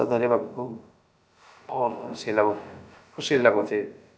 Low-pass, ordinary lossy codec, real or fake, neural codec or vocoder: none; none; fake; codec, 16 kHz, about 1 kbps, DyCAST, with the encoder's durations